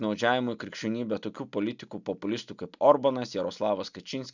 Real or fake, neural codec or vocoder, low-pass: real; none; 7.2 kHz